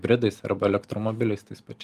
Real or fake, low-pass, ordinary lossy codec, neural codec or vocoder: real; 14.4 kHz; Opus, 32 kbps; none